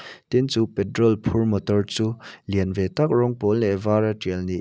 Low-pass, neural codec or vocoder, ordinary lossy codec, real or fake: none; none; none; real